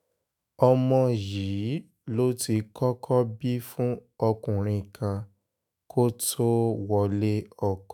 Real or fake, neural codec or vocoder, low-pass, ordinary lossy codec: fake; autoencoder, 48 kHz, 128 numbers a frame, DAC-VAE, trained on Japanese speech; none; none